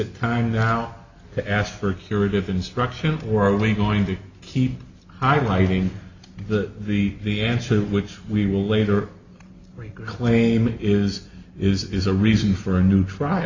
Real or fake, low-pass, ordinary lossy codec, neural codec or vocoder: real; 7.2 kHz; Opus, 64 kbps; none